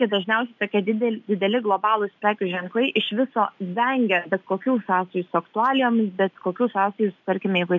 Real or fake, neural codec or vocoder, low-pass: real; none; 7.2 kHz